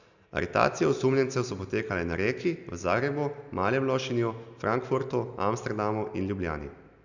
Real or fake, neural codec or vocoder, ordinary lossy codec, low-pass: real; none; none; 7.2 kHz